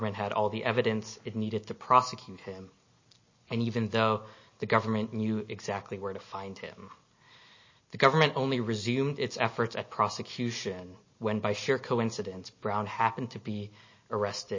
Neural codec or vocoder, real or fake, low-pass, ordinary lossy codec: none; real; 7.2 kHz; MP3, 32 kbps